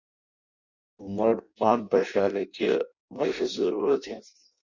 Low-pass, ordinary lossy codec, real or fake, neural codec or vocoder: 7.2 kHz; Opus, 64 kbps; fake; codec, 16 kHz in and 24 kHz out, 0.6 kbps, FireRedTTS-2 codec